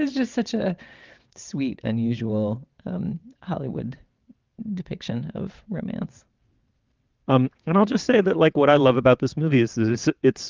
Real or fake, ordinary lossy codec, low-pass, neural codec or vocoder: fake; Opus, 32 kbps; 7.2 kHz; vocoder, 22.05 kHz, 80 mel bands, WaveNeXt